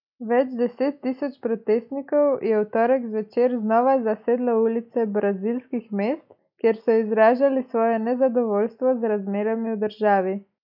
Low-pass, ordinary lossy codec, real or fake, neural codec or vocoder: 5.4 kHz; none; real; none